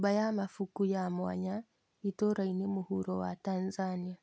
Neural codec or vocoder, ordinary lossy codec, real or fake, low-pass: none; none; real; none